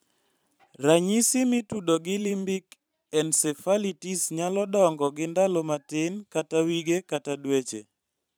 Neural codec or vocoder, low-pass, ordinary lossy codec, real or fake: vocoder, 44.1 kHz, 128 mel bands every 512 samples, BigVGAN v2; none; none; fake